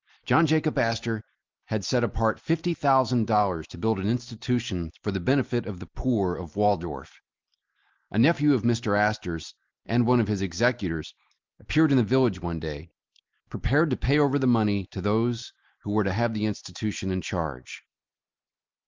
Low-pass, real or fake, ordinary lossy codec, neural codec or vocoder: 7.2 kHz; real; Opus, 24 kbps; none